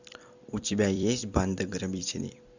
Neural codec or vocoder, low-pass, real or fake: vocoder, 44.1 kHz, 128 mel bands every 256 samples, BigVGAN v2; 7.2 kHz; fake